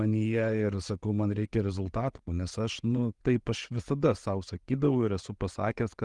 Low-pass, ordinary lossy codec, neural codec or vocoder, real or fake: 10.8 kHz; Opus, 24 kbps; vocoder, 24 kHz, 100 mel bands, Vocos; fake